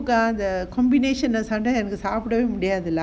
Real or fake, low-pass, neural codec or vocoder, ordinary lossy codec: real; none; none; none